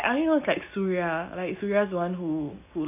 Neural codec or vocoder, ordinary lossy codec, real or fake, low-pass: none; none; real; 3.6 kHz